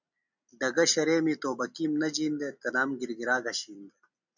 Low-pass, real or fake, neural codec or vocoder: 7.2 kHz; real; none